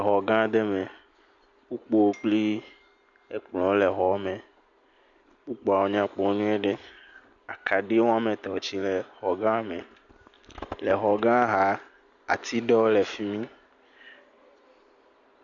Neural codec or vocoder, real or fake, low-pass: none; real; 7.2 kHz